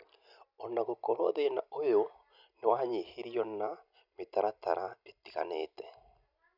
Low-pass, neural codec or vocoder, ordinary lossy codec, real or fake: 5.4 kHz; none; none; real